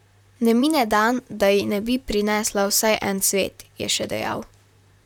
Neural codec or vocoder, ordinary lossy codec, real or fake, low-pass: vocoder, 44.1 kHz, 128 mel bands, Pupu-Vocoder; none; fake; 19.8 kHz